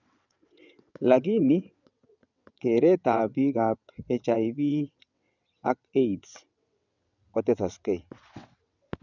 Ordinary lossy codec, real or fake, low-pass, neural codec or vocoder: none; fake; 7.2 kHz; vocoder, 22.05 kHz, 80 mel bands, WaveNeXt